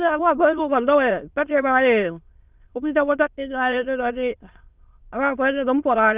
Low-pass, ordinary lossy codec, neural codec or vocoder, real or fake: 3.6 kHz; Opus, 24 kbps; autoencoder, 22.05 kHz, a latent of 192 numbers a frame, VITS, trained on many speakers; fake